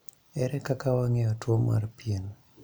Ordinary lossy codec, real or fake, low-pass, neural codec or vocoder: none; real; none; none